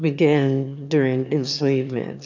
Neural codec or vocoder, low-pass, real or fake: autoencoder, 22.05 kHz, a latent of 192 numbers a frame, VITS, trained on one speaker; 7.2 kHz; fake